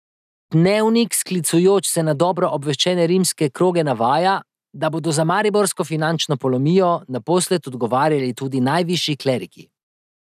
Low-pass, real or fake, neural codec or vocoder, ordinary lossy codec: 14.4 kHz; real; none; none